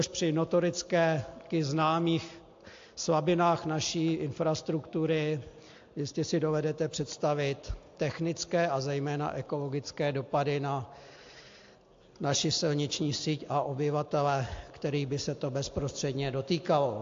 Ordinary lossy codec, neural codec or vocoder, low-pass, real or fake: AAC, 48 kbps; none; 7.2 kHz; real